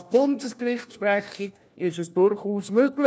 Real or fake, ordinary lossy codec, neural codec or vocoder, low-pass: fake; none; codec, 16 kHz, 1 kbps, FunCodec, trained on LibriTTS, 50 frames a second; none